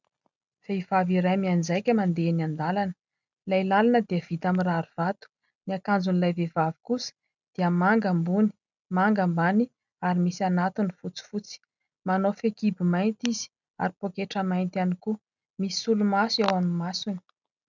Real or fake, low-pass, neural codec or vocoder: real; 7.2 kHz; none